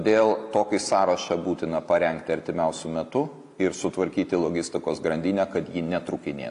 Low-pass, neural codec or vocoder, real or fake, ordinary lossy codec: 10.8 kHz; none; real; AAC, 64 kbps